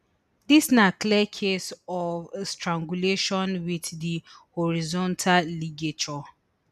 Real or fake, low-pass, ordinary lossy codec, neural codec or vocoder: real; 14.4 kHz; none; none